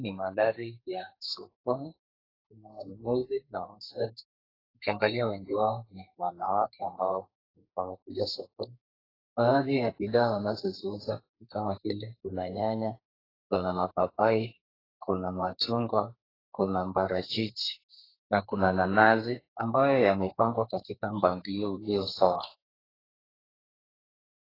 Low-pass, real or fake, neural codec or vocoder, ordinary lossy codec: 5.4 kHz; fake; codec, 32 kHz, 1.9 kbps, SNAC; AAC, 24 kbps